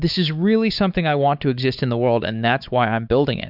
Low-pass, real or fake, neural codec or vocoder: 5.4 kHz; fake; codec, 16 kHz, 4 kbps, X-Codec, WavLM features, trained on Multilingual LibriSpeech